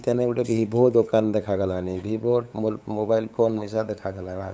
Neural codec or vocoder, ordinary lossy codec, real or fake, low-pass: codec, 16 kHz, 8 kbps, FunCodec, trained on LibriTTS, 25 frames a second; none; fake; none